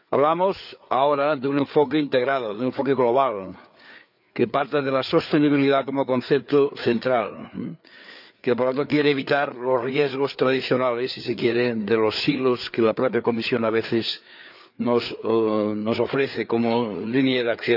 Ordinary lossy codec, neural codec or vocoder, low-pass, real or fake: none; codec, 16 kHz, 4 kbps, FreqCodec, larger model; 5.4 kHz; fake